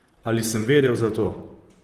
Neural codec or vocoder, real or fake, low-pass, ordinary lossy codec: vocoder, 44.1 kHz, 128 mel bands, Pupu-Vocoder; fake; 14.4 kHz; Opus, 24 kbps